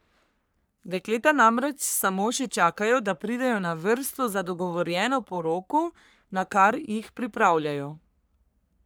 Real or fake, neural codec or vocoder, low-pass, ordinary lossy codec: fake; codec, 44.1 kHz, 3.4 kbps, Pupu-Codec; none; none